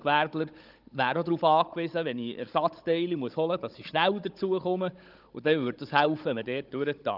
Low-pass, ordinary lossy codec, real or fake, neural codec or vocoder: 5.4 kHz; Opus, 32 kbps; fake; codec, 16 kHz, 16 kbps, FunCodec, trained on Chinese and English, 50 frames a second